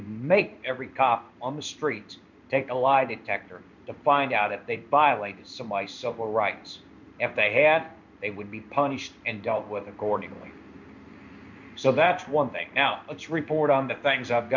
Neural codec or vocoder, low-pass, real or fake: codec, 16 kHz in and 24 kHz out, 1 kbps, XY-Tokenizer; 7.2 kHz; fake